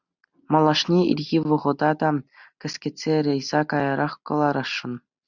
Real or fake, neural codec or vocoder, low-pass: real; none; 7.2 kHz